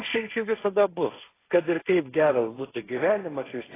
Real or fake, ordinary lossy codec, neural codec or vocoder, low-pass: fake; AAC, 16 kbps; codec, 16 kHz, 1.1 kbps, Voila-Tokenizer; 3.6 kHz